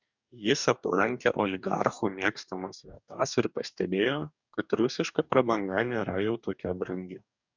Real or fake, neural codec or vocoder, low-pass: fake; codec, 44.1 kHz, 2.6 kbps, DAC; 7.2 kHz